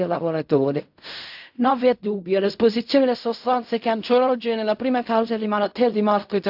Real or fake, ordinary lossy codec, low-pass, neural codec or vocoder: fake; AAC, 48 kbps; 5.4 kHz; codec, 16 kHz in and 24 kHz out, 0.4 kbps, LongCat-Audio-Codec, fine tuned four codebook decoder